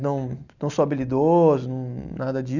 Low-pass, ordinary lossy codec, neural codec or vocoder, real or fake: 7.2 kHz; none; none; real